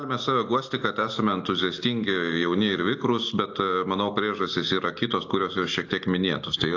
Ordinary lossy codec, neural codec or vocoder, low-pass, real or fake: AAC, 48 kbps; none; 7.2 kHz; real